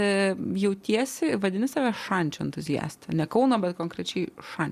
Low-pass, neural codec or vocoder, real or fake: 14.4 kHz; none; real